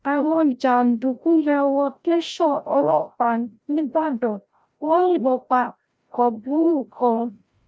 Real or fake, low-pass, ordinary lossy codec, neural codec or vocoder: fake; none; none; codec, 16 kHz, 0.5 kbps, FreqCodec, larger model